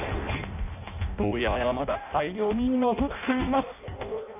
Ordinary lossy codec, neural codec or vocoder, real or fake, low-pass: none; codec, 16 kHz in and 24 kHz out, 0.6 kbps, FireRedTTS-2 codec; fake; 3.6 kHz